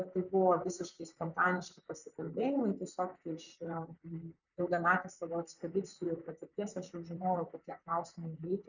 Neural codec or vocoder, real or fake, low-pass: vocoder, 44.1 kHz, 128 mel bands, Pupu-Vocoder; fake; 7.2 kHz